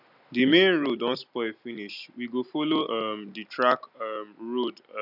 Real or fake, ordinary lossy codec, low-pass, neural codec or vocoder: real; none; 5.4 kHz; none